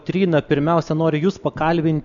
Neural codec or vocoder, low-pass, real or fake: none; 7.2 kHz; real